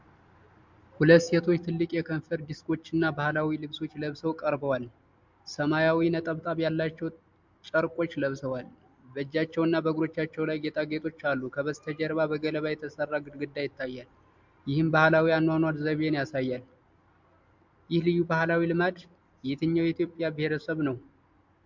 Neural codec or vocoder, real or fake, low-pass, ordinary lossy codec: none; real; 7.2 kHz; MP3, 64 kbps